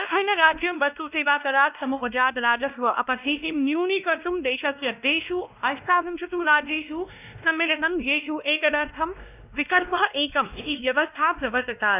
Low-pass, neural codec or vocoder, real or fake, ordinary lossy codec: 3.6 kHz; codec, 16 kHz, 1 kbps, X-Codec, WavLM features, trained on Multilingual LibriSpeech; fake; none